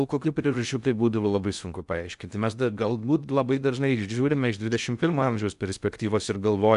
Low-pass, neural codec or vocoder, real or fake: 10.8 kHz; codec, 16 kHz in and 24 kHz out, 0.6 kbps, FocalCodec, streaming, 2048 codes; fake